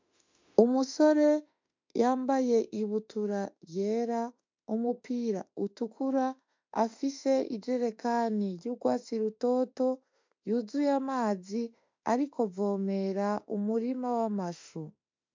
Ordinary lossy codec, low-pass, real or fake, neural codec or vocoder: MP3, 64 kbps; 7.2 kHz; fake; autoencoder, 48 kHz, 32 numbers a frame, DAC-VAE, trained on Japanese speech